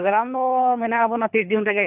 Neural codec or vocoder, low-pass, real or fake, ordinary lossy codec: codec, 24 kHz, 3 kbps, HILCodec; 3.6 kHz; fake; none